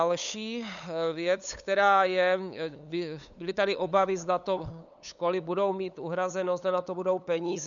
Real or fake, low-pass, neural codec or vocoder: fake; 7.2 kHz; codec, 16 kHz, 8 kbps, FunCodec, trained on LibriTTS, 25 frames a second